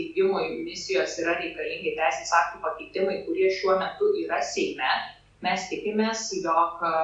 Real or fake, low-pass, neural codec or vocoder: real; 9.9 kHz; none